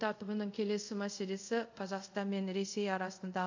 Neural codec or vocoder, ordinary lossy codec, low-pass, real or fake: codec, 24 kHz, 0.5 kbps, DualCodec; AAC, 48 kbps; 7.2 kHz; fake